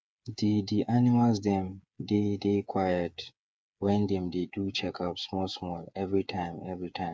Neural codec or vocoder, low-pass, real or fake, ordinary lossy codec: codec, 16 kHz, 8 kbps, FreqCodec, smaller model; none; fake; none